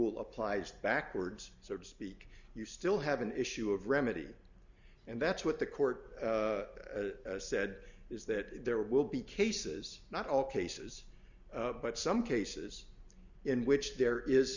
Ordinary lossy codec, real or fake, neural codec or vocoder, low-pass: Opus, 64 kbps; real; none; 7.2 kHz